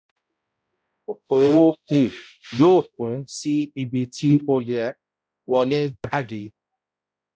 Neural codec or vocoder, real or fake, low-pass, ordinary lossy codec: codec, 16 kHz, 0.5 kbps, X-Codec, HuBERT features, trained on balanced general audio; fake; none; none